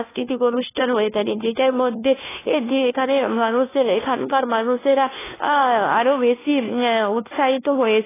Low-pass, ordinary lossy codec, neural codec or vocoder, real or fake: 3.6 kHz; AAC, 16 kbps; codec, 16 kHz, 1 kbps, FunCodec, trained on LibriTTS, 50 frames a second; fake